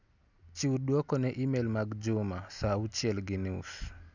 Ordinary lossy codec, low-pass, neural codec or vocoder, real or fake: none; 7.2 kHz; none; real